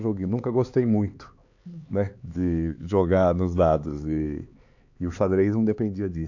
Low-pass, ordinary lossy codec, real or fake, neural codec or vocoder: 7.2 kHz; AAC, 48 kbps; fake; codec, 16 kHz, 4 kbps, X-Codec, HuBERT features, trained on LibriSpeech